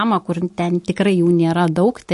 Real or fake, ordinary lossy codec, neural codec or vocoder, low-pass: real; MP3, 48 kbps; none; 14.4 kHz